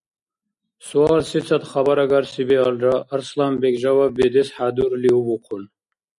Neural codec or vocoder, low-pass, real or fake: none; 10.8 kHz; real